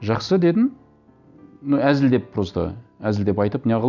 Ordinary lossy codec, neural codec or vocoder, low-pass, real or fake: none; none; 7.2 kHz; real